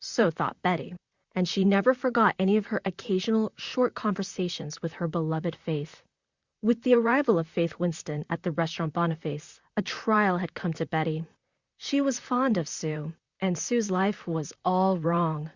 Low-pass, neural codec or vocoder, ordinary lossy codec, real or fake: 7.2 kHz; vocoder, 44.1 kHz, 128 mel bands, Pupu-Vocoder; Opus, 64 kbps; fake